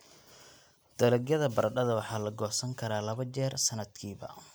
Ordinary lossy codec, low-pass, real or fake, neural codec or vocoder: none; none; real; none